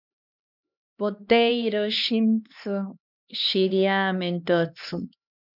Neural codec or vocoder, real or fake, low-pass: codec, 16 kHz, 2 kbps, X-Codec, HuBERT features, trained on LibriSpeech; fake; 5.4 kHz